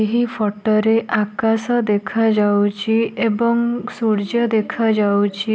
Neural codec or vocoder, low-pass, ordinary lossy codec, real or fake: none; none; none; real